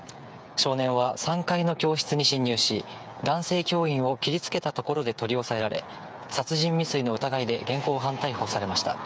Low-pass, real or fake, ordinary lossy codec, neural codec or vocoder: none; fake; none; codec, 16 kHz, 8 kbps, FreqCodec, smaller model